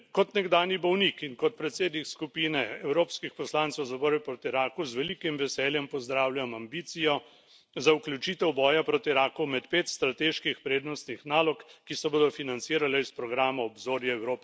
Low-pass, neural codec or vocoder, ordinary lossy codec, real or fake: none; none; none; real